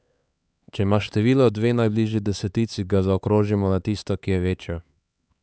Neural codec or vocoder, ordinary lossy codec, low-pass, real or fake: codec, 16 kHz, 4 kbps, X-Codec, HuBERT features, trained on LibriSpeech; none; none; fake